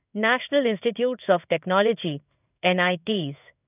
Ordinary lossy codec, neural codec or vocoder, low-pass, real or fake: none; codec, 16 kHz in and 24 kHz out, 1 kbps, XY-Tokenizer; 3.6 kHz; fake